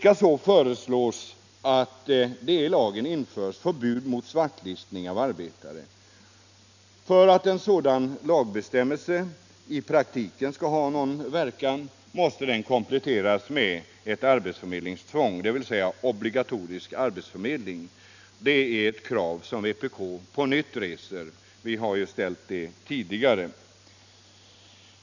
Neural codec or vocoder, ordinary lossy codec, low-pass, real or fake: none; none; 7.2 kHz; real